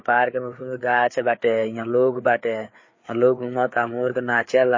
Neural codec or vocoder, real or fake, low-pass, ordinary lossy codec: codec, 16 kHz, 4 kbps, FreqCodec, larger model; fake; 7.2 kHz; MP3, 32 kbps